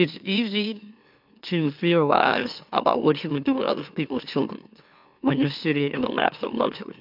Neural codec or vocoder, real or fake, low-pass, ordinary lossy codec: autoencoder, 44.1 kHz, a latent of 192 numbers a frame, MeloTTS; fake; 5.4 kHz; MP3, 48 kbps